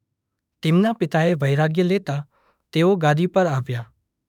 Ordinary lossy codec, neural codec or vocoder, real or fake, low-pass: none; autoencoder, 48 kHz, 32 numbers a frame, DAC-VAE, trained on Japanese speech; fake; 19.8 kHz